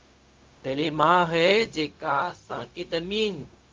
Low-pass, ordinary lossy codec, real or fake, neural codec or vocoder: 7.2 kHz; Opus, 24 kbps; fake; codec, 16 kHz, 0.4 kbps, LongCat-Audio-Codec